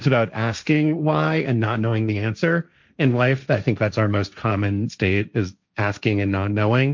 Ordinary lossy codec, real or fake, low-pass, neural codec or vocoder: MP3, 64 kbps; fake; 7.2 kHz; codec, 16 kHz, 1.1 kbps, Voila-Tokenizer